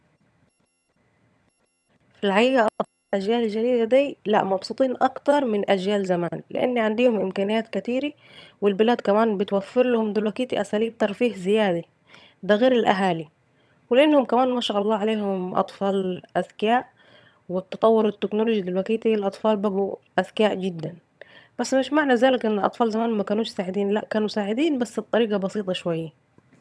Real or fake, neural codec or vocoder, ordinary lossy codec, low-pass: fake; vocoder, 22.05 kHz, 80 mel bands, HiFi-GAN; none; none